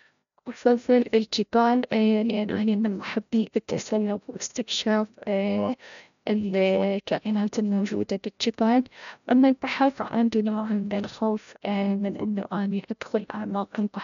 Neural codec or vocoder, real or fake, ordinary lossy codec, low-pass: codec, 16 kHz, 0.5 kbps, FreqCodec, larger model; fake; none; 7.2 kHz